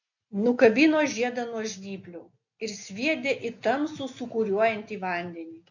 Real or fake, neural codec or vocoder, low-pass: real; none; 7.2 kHz